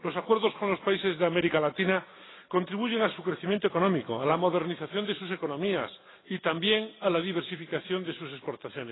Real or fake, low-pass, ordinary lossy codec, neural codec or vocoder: real; 7.2 kHz; AAC, 16 kbps; none